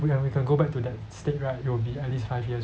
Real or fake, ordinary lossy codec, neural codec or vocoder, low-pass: real; none; none; none